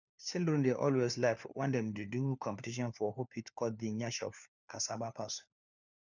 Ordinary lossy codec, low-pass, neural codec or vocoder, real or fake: none; 7.2 kHz; codec, 16 kHz, 4 kbps, FunCodec, trained on LibriTTS, 50 frames a second; fake